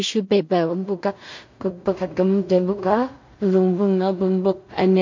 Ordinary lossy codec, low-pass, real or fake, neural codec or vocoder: MP3, 48 kbps; 7.2 kHz; fake; codec, 16 kHz in and 24 kHz out, 0.4 kbps, LongCat-Audio-Codec, two codebook decoder